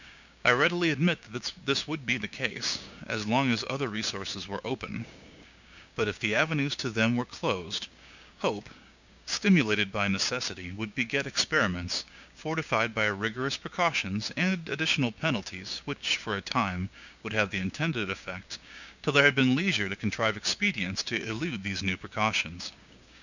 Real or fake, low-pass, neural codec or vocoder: fake; 7.2 kHz; codec, 16 kHz, 6 kbps, DAC